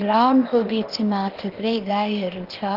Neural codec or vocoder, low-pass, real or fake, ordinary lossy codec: codec, 16 kHz, 0.8 kbps, ZipCodec; 5.4 kHz; fake; Opus, 16 kbps